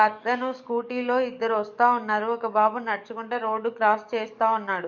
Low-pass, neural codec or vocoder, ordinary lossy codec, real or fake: 7.2 kHz; codec, 44.1 kHz, 7.8 kbps, DAC; none; fake